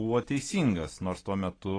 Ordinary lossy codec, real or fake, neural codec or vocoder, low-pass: AAC, 32 kbps; real; none; 9.9 kHz